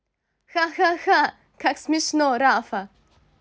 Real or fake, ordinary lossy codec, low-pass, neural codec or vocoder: real; none; none; none